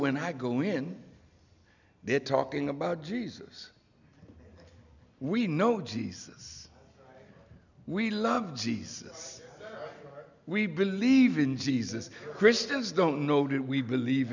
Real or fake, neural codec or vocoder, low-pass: real; none; 7.2 kHz